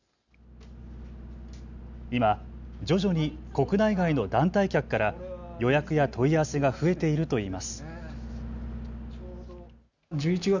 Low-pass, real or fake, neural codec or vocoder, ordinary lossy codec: 7.2 kHz; real; none; none